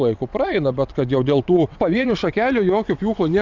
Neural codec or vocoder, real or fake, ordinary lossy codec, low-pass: vocoder, 22.05 kHz, 80 mel bands, WaveNeXt; fake; Opus, 64 kbps; 7.2 kHz